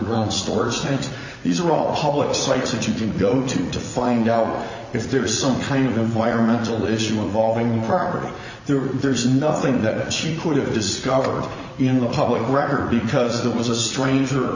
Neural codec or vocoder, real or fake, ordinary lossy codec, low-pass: vocoder, 44.1 kHz, 80 mel bands, Vocos; fake; Opus, 64 kbps; 7.2 kHz